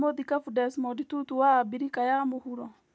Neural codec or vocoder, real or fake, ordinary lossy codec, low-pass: none; real; none; none